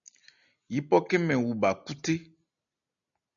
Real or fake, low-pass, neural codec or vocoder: real; 7.2 kHz; none